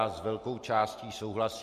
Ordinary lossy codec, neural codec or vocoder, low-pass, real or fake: MP3, 64 kbps; none; 14.4 kHz; real